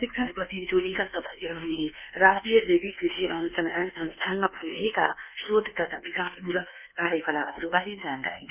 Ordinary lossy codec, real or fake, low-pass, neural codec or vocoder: none; fake; 3.6 kHz; codec, 24 kHz, 1.2 kbps, DualCodec